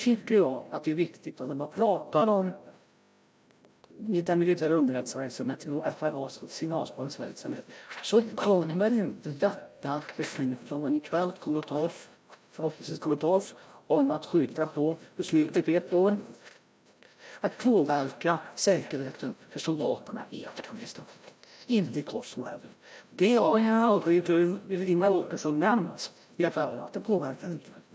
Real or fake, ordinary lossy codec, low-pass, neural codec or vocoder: fake; none; none; codec, 16 kHz, 0.5 kbps, FreqCodec, larger model